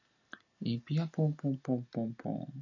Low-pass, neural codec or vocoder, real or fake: 7.2 kHz; none; real